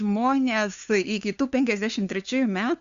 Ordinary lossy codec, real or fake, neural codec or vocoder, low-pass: Opus, 64 kbps; fake; codec, 16 kHz, 2 kbps, FunCodec, trained on LibriTTS, 25 frames a second; 7.2 kHz